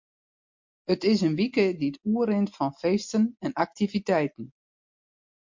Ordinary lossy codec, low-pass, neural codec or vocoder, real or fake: MP3, 48 kbps; 7.2 kHz; none; real